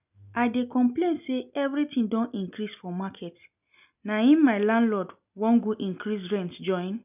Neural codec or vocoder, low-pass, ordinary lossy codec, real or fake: none; 3.6 kHz; none; real